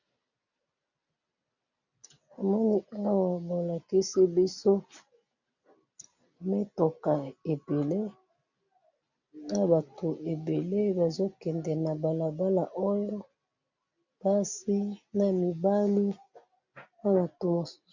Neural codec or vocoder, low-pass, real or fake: none; 7.2 kHz; real